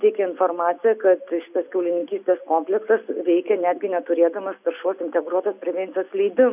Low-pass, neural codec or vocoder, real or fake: 3.6 kHz; none; real